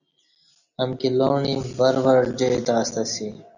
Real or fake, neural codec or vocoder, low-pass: real; none; 7.2 kHz